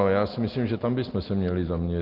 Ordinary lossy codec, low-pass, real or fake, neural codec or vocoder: Opus, 16 kbps; 5.4 kHz; real; none